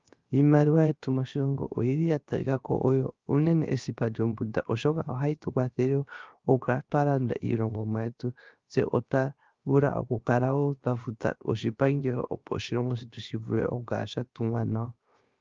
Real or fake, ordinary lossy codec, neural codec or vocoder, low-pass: fake; Opus, 32 kbps; codec, 16 kHz, 0.7 kbps, FocalCodec; 7.2 kHz